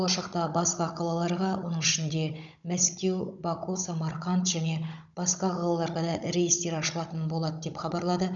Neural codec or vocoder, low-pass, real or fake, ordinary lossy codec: codec, 16 kHz, 16 kbps, FunCodec, trained on Chinese and English, 50 frames a second; 7.2 kHz; fake; none